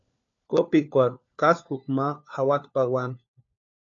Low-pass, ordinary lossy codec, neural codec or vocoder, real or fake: 7.2 kHz; AAC, 48 kbps; codec, 16 kHz, 4 kbps, FunCodec, trained on LibriTTS, 50 frames a second; fake